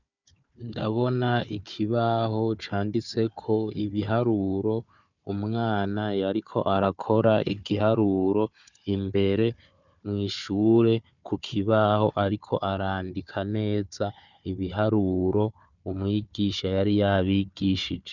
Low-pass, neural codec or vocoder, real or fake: 7.2 kHz; codec, 16 kHz, 4 kbps, FunCodec, trained on Chinese and English, 50 frames a second; fake